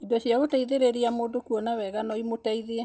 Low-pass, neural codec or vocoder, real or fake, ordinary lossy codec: none; none; real; none